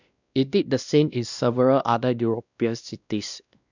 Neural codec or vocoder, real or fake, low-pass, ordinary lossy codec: codec, 16 kHz, 1 kbps, X-Codec, WavLM features, trained on Multilingual LibriSpeech; fake; 7.2 kHz; none